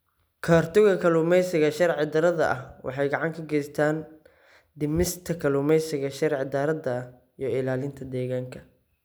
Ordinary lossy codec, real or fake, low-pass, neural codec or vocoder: none; real; none; none